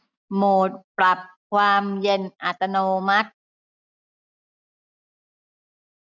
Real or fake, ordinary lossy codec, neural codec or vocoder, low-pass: real; none; none; 7.2 kHz